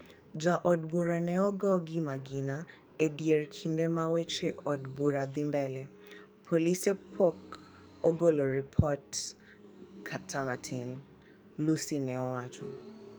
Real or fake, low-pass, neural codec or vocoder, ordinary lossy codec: fake; none; codec, 44.1 kHz, 2.6 kbps, SNAC; none